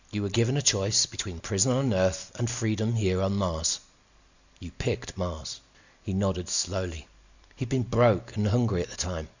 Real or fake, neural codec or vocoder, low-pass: real; none; 7.2 kHz